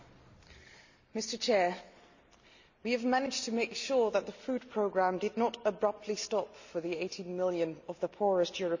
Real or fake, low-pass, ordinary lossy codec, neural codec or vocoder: real; 7.2 kHz; Opus, 64 kbps; none